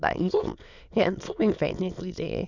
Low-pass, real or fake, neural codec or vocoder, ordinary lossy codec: 7.2 kHz; fake; autoencoder, 22.05 kHz, a latent of 192 numbers a frame, VITS, trained on many speakers; none